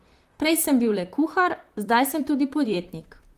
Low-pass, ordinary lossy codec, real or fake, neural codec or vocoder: 14.4 kHz; Opus, 24 kbps; fake; vocoder, 44.1 kHz, 128 mel bands, Pupu-Vocoder